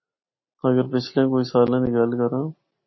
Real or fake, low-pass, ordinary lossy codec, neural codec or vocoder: real; 7.2 kHz; MP3, 24 kbps; none